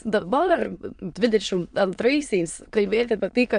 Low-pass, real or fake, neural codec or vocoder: 9.9 kHz; fake; autoencoder, 22.05 kHz, a latent of 192 numbers a frame, VITS, trained on many speakers